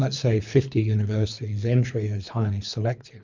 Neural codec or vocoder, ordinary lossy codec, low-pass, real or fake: codec, 24 kHz, 3 kbps, HILCodec; MP3, 64 kbps; 7.2 kHz; fake